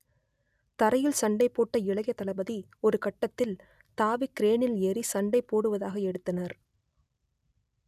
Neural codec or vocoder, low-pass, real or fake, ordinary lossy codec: none; 14.4 kHz; real; none